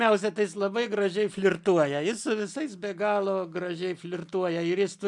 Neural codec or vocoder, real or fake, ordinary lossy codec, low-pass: none; real; MP3, 96 kbps; 10.8 kHz